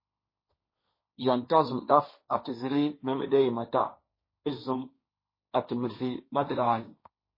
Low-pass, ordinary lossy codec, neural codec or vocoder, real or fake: 5.4 kHz; MP3, 24 kbps; codec, 16 kHz, 1.1 kbps, Voila-Tokenizer; fake